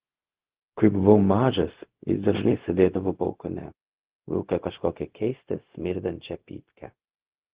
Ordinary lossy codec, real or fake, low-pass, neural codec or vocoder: Opus, 16 kbps; fake; 3.6 kHz; codec, 16 kHz, 0.4 kbps, LongCat-Audio-Codec